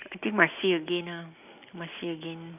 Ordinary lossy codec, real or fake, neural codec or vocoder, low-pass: none; real; none; 3.6 kHz